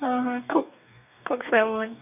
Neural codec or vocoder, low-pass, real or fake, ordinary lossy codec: codec, 44.1 kHz, 2.6 kbps, DAC; 3.6 kHz; fake; none